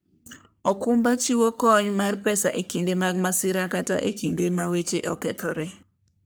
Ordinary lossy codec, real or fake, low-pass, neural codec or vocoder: none; fake; none; codec, 44.1 kHz, 3.4 kbps, Pupu-Codec